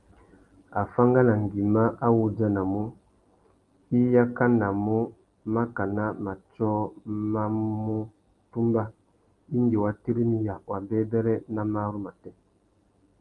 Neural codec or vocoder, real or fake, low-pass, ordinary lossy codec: none; real; 10.8 kHz; Opus, 24 kbps